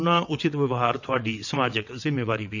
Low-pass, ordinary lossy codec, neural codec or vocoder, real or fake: 7.2 kHz; none; vocoder, 22.05 kHz, 80 mel bands, WaveNeXt; fake